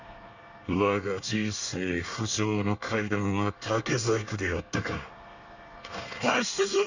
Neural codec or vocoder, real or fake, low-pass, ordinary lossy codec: codec, 24 kHz, 1 kbps, SNAC; fake; 7.2 kHz; Opus, 64 kbps